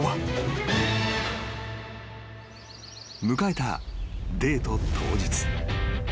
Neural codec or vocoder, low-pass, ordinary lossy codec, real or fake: none; none; none; real